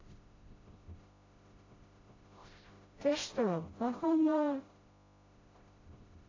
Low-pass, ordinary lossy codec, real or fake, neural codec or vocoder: 7.2 kHz; AAC, 32 kbps; fake; codec, 16 kHz, 0.5 kbps, FreqCodec, smaller model